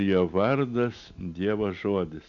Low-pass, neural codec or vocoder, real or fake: 7.2 kHz; none; real